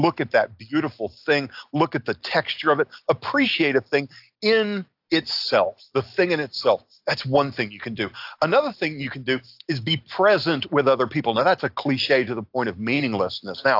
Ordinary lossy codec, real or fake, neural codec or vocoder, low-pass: AAC, 48 kbps; real; none; 5.4 kHz